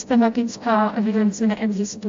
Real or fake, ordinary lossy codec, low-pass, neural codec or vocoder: fake; AAC, 48 kbps; 7.2 kHz; codec, 16 kHz, 0.5 kbps, FreqCodec, smaller model